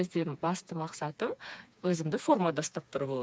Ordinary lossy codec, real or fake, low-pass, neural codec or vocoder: none; fake; none; codec, 16 kHz, 4 kbps, FreqCodec, smaller model